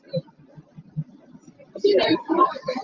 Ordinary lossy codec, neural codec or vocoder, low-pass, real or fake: Opus, 32 kbps; none; 7.2 kHz; real